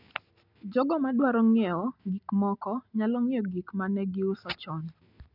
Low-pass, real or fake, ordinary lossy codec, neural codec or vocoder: 5.4 kHz; real; none; none